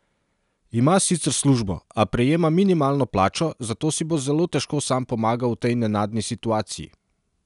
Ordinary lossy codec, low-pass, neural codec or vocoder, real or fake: none; 10.8 kHz; none; real